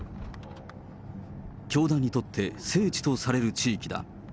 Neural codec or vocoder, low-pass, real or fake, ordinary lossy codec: none; none; real; none